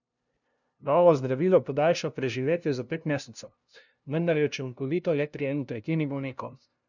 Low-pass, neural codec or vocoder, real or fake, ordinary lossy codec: 7.2 kHz; codec, 16 kHz, 0.5 kbps, FunCodec, trained on LibriTTS, 25 frames a second; fake; none